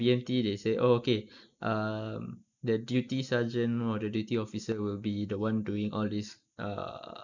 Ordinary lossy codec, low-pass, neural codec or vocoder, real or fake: none; 7.2 kHz; none; real